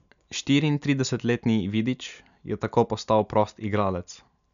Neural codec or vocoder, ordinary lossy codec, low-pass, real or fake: none; none; 7.2 kHz; real